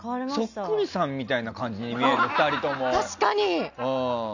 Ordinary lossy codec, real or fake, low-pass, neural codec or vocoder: none; real; 7.2 kHz; none